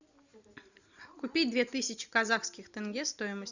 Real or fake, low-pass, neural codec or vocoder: real; 7.2 kHz; none